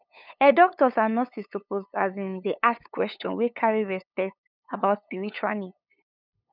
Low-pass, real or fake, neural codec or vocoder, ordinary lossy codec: 5.4 kHz; fake; codec, 16 kHz, 8 kbps, FunCodec, trained on LibriTTS, 25 frames a second; none